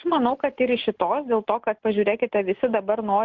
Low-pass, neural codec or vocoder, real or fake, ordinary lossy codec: 7.2 kHz; none; real; Opus, 16 kbps